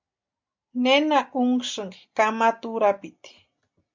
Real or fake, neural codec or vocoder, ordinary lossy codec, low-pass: real; none; MP3, 64 kbps; 7.2 kHz